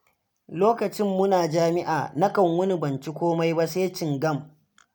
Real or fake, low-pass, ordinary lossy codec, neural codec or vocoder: real; none; none; none